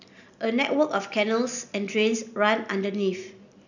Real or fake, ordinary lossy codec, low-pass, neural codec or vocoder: real; none; 7.2 kHz; none